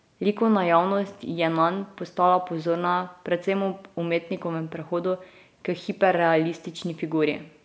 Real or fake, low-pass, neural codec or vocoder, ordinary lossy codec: real; none; none; none